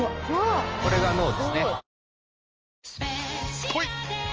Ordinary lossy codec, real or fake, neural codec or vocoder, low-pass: Opus, 24 kbps; real; none; 7.2 kHz